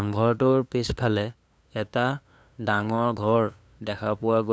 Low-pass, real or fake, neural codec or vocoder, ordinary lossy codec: none; fake; codec, 16 kHz, 2 kbps, FunCodec, trained on LibriTTS, 25 frames a second; none